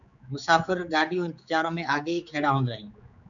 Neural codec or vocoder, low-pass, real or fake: codec, 16 kHz, 4 kbps, X-Codec, HuBERT features, trained on general audio; 7.2 kHz; fake